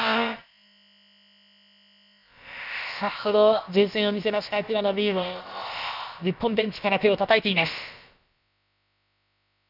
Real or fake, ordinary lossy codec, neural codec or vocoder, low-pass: fake; none; codec, 16 kHz, about 1 kbps, DyCAST, with the encoder's durations; 5.4 kHz